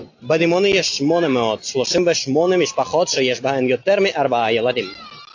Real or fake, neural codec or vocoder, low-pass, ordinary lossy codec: real; none; 7.2 kHz; AAC, 48 kbps